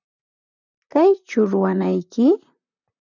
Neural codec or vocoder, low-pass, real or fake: vocoder, 44.1 kHz, 128 mel bands, Pupu-Vocoder; 7.2 kHz; fake